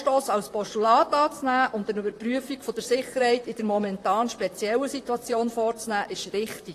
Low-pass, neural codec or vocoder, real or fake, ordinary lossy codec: 14.4 kHz; vocoder, 44.1 kHz, 128 mel bands, Pupu-Vocoder; fake; AAC, 48 kbps